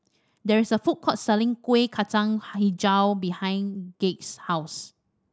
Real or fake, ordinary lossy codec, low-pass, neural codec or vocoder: real; none; none; none